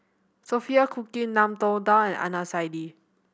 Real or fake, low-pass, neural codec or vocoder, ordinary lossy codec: real; none; none; none